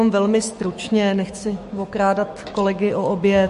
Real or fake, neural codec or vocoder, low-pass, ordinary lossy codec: fake; autoencoder, 48 kHz, 128 numbers a frame, DAC-VAE, trained on Japanese speech; 14.4 kHz; MP3, 48 kbps